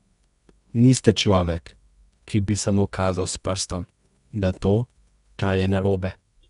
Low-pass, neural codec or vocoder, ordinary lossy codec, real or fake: 10.8 kHz; codec, 24 kHz, 0.9 kbps, WavTokenizer, medium music audio release; none; fake